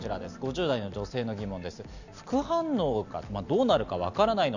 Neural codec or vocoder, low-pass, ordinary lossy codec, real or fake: none; 7.2 kHz; none; real